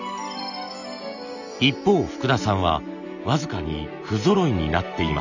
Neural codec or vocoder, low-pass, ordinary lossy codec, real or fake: none; 7.2 kHz; none; real